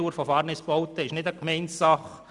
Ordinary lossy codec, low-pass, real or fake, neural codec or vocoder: none; 10.8 kHz; real; none